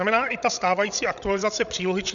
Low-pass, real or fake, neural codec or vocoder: 7.2 kHz; fake; codec, 16 kHz, 16 kbps, FreqCodec, larger model